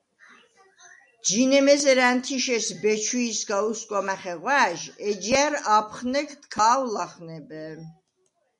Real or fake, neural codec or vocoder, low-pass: real; none; 10.8 kHz